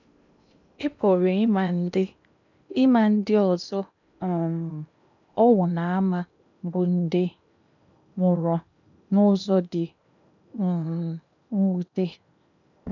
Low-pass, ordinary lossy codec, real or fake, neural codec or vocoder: 7.2 kHz; none; fake; codec, 16 kHz in and 24 kHz out, 0.8 kbps, FocalCodec, streaming, 65536 codes